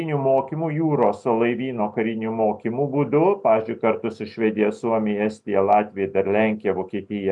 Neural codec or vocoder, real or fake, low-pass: none; real; 10.8 kHz